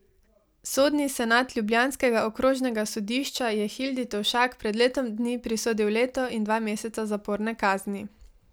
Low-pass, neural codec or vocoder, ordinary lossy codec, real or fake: none; none; none; real